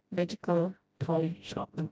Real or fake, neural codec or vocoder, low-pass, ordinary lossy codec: fake; codec, 16 kHz, 0.5 kbps, FreqCodec, smaller model; none; none